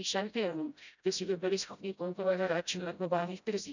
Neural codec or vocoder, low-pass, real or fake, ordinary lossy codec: codec, 16 kHz, 0.5 kbps, FreqCodec, smaller model; 7.2 kHz; fake; none